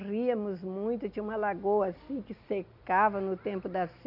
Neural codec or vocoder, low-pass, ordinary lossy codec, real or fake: none; 5.4 kHz; none; real